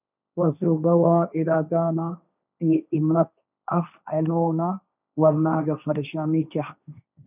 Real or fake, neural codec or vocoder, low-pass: fake; codec, 16 kHz, 1.1 kbps, Voila-Tokenizer; 3.6 kHz